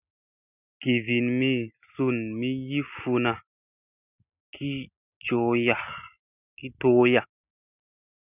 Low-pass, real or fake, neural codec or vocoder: 3.6 kHz; real; none